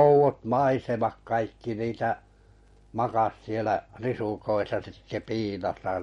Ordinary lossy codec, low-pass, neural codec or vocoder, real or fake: MP3, 48 kbps; 19.8 kHz; none; real